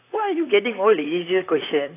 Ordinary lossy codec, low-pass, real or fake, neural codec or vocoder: AAC, 24 kbps; 3.6 kHz; fake; vocoder, 44.1 kHz, 128 mel bands, Pupu-Vocoder